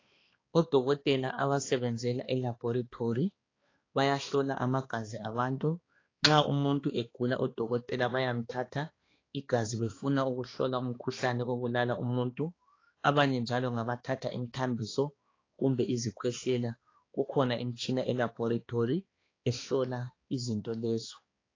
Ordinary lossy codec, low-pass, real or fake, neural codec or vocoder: AAC, 32 kbps; 7.2 kHz; fake; codec, 16 kHz, 2 kbps, X-Codec, HuBERT features, trained on balanced general audio